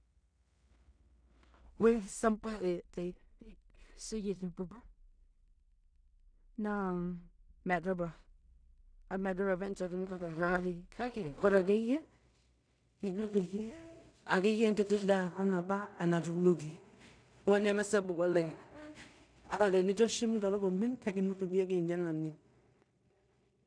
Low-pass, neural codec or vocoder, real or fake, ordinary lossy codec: 9.9 kHz; codec, 16 kHz in and 24 kHz out, 0.4 kbps, LongCat-Audio-Codec, two codebook decoder; fake; none